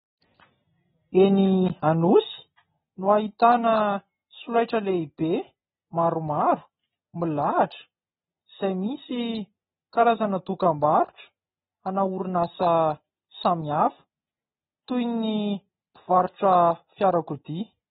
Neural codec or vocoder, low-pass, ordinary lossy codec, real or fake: none; 19.8 kHz; AAC, 16 kbps; real